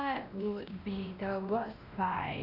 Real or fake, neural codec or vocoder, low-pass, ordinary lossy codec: fake; codec, 16 kHz, 1 kbps, X-Codec, WavLM features, trained on Multilingual LibriSpeech; 5.4 kHz; none